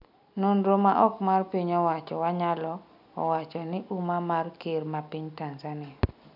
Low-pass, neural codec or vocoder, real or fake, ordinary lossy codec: 5.4 kHz; none; real; none